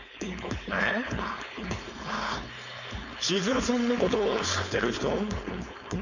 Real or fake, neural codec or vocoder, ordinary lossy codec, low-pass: fake; codec, 16 kHz, 4.8 kbps, FACodec; none; 7.2 kHz